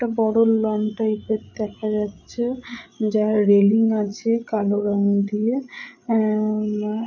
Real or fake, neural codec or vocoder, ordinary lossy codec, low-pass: fake; codec, 16 kHz, 8 kbps, FreqCodec, larger model; none; 7.2 kHz